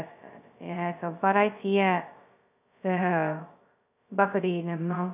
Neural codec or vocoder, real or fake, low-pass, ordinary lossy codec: codec, 16 kHz, 0.2 kbps, FocalCodec; fake; 3.6 kHz; none